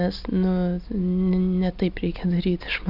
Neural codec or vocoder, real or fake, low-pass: none; real; 5.4 kHz